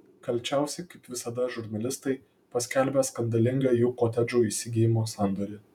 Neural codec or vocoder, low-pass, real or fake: vocoder, 48 kHz, 128 mel bands, Vocos; 19.8 kHz; fake